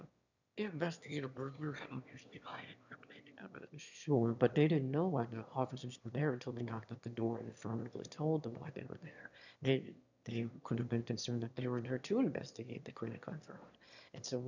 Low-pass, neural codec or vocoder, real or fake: 7.2 kHz; autoencoder, 22.05 kHz, a latent of 192 numbers a frame, VITS, trained on one speaker; fake